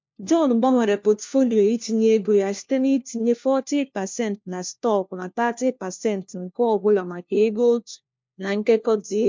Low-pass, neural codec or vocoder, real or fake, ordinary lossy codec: 7.2 kHz; codec, 16 kHz, 1 kbps, FunCodec, trained on LibriTTS, 50 frames a second; fake; MP3, 64 kbps